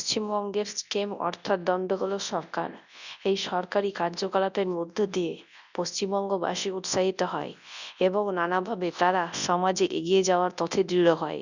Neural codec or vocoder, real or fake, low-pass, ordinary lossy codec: codec, 24 kHz, 0.9 kbps, WavTokenizer, large speech release; fake; 7.2 kHz; none